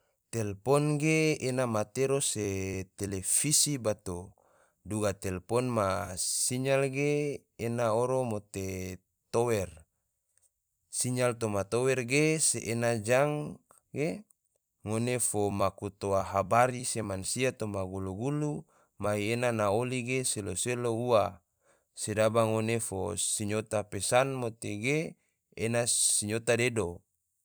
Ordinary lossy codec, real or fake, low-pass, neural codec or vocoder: none; fake; none; vocoder, 44.1 kHz, 128 mel bands every 256 samples, BigVGAN v2